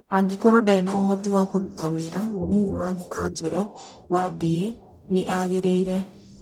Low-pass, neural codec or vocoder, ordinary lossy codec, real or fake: 19.8 kHz; codec, 44.1 kHz, 0.9 kbps, DAC; none; fake